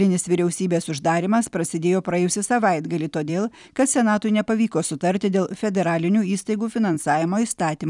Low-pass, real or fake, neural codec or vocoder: 10.8 kHz; fake; vocoder, 44.1 kHz, 128 mel bands every 256 samples, BigVGAN v2